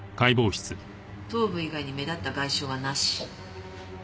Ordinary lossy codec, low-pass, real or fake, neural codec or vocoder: none; none; real; none